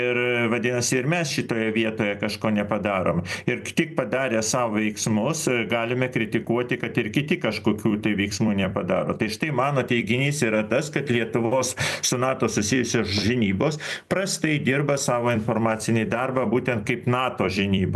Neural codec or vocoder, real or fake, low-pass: vocoder, 44.1 kHz, 128 mel bands every 256 samples, BigVGAN v2; fake; 14.4 kHz